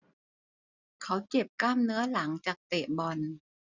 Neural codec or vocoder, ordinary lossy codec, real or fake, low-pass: none; none; real; 7.2 kHz